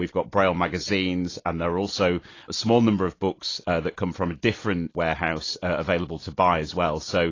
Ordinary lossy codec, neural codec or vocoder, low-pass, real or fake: AAC, 32 kbps; none; 7.2 kHz; real